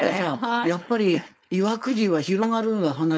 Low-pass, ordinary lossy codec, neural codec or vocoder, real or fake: none; none; codec, 16 kHz, 4.8 kbps, FACodec; fake